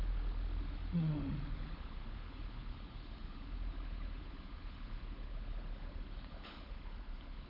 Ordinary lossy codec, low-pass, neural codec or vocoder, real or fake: AAC, 32 kbps; 5.4 kHz; codec, 16 kHz, 16 kbps, FunCodec, trained on LibriTTS, 50 frames a second; fake